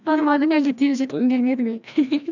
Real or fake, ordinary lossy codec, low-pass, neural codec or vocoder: fake; none; 7.2 kHz; codec, 16 kHz, 1 kbps, FreqCodec, larger model